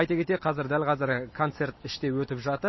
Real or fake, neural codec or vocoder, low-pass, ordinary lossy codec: real; none; 7.2 kHz; MP3, 24 kbps